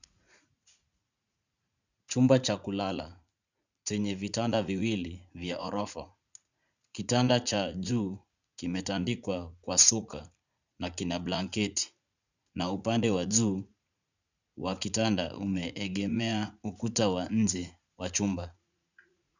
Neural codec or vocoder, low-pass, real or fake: vocoder, 44.1 kHz, 80 mel bands, Vocos; 7.2 kHz; fake